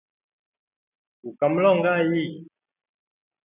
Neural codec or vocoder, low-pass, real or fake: none; 3.6 kHz; real